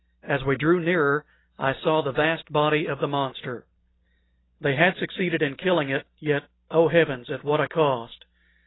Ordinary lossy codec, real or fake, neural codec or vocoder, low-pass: AAC, 16 kbps; real; none; 7.2 kHz